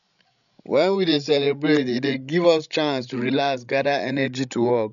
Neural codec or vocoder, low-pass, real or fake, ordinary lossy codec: codec, 16 kHz, 16 kbps, FreqCodec, larger model; 7.2 kHz; fake; none